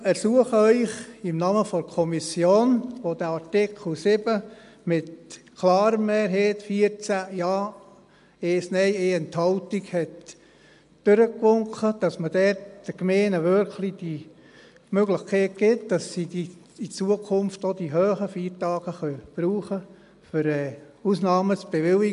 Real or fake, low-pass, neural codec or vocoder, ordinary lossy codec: real; 10.8 kHz; none; MP3, 64 kbps